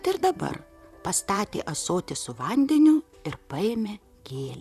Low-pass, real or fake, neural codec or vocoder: 14.4 kHz; fake; vocoder, 44.1 kHz, 128 mel bands, Pupu-Vocoder